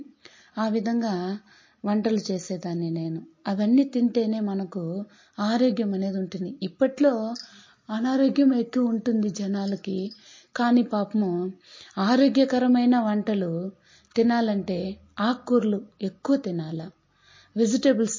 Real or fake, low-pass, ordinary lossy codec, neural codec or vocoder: real; 7.2 kHz; MP3, 32 kbps; none